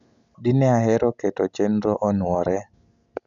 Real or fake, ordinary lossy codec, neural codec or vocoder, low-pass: real; MP3, 96 kbps; none; 7.2 kHz